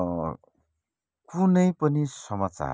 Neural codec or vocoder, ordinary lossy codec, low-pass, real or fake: none; none; none; real